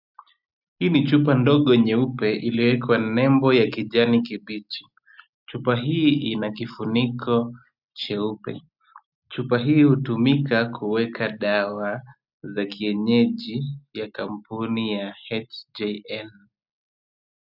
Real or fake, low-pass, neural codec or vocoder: real; 5.4 kHz; none